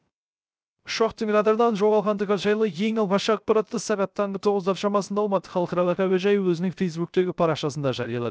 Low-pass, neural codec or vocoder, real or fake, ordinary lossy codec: none; codec, 16 kHz, 0.3 kbps, FocalCodec; fake; none